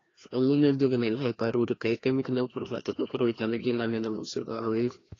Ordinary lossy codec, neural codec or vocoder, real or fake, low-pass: AAC, 32 kbps; codec, 16 kHz, 1 kbps, FreqCodec, larger model; fake; 7.2 kHz